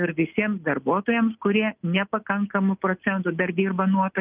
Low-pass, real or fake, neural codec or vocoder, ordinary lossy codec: 3.6 kHz; real; none; Opus, 24 kbps